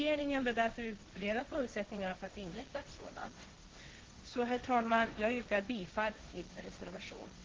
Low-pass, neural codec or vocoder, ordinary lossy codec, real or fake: 7.2 kHz; codec, 16 kHz, 1.1 kbps, Voila-Tokenizer; Opus, 16 kbps; fake